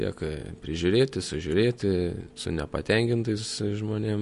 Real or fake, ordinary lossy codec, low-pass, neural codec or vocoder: real; MP3, 48 kbps; 14.4 kHz; none